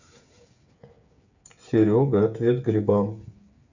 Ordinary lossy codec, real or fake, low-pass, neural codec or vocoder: none; fake; 7.2 kHz; codec, 16 kHz, 8 kbps, FreqCodec, smaller model